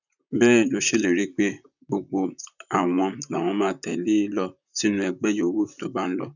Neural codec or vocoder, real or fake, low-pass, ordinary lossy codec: vocoder, 44.1 kHz, 128 mel bands, Pupu-Vocoder; fake; 7.2 kHz; none